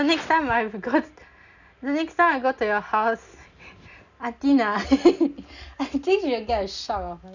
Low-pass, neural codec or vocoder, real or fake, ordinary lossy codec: 7.2 kHz; none; real; none